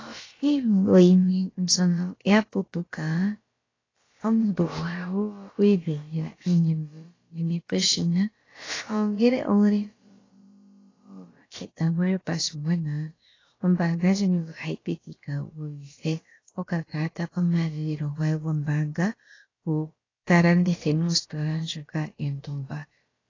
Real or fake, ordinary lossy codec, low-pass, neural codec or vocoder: fake; AAC, 32 kbps; 7.2 kHz; codec, 16 kHz, about 1 kbps, DyCAST, with the encoder's durations